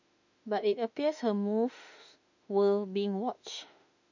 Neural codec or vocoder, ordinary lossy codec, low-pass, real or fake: autoencoder, 48 kHz, 32 numbers a frame, DAC-VAE, trained on Japanese speech; none; 7.2 kHz; fake